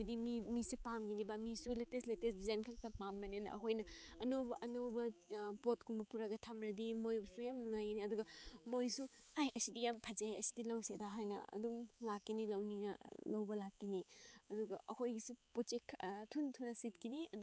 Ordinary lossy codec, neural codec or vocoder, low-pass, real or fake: none; codec, 16 kHz, 4 kbps, X-Codec, HuBERT features, trained on balanced general audio; none; fake